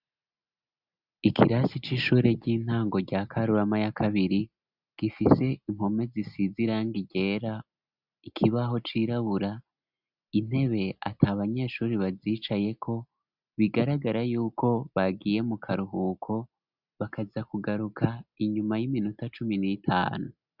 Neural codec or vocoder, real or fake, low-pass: none; real; 5.4 kHz